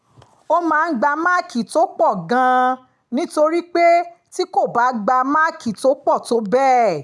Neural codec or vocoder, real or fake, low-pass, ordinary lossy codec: none; real; none; none